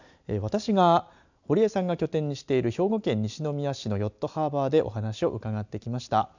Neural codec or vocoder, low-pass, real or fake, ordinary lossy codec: none; 7.2 kHz; real; none